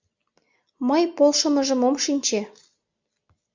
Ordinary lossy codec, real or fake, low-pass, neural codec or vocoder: MP3, 64 kbps; real; 7.2 kHz; none